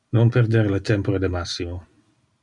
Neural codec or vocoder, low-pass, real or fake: none; 10.8 kHz; real